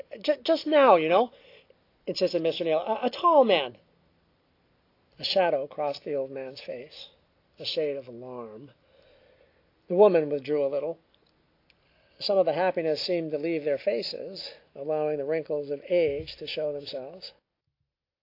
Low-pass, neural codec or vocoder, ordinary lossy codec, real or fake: 5.4 kHz; none; AAC, 32 kbps; real